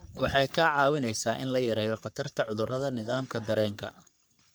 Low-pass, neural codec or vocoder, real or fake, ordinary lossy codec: none; codec, 44.1 kHz, 3.4 kbps, Pupu-Codec; fake; none